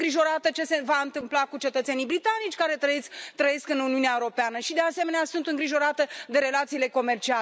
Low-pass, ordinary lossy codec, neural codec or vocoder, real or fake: none; none; none; real